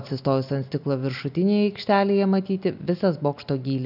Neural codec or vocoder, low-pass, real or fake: none; 5.4 kHz; real